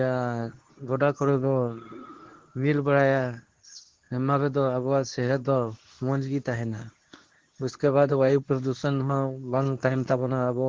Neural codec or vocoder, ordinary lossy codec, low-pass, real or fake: codec, 24 kHz, 0.9 kbps, WavTokenizer, medium speech release version 2; Opus, 16 kbps; 7.2 kHz; fake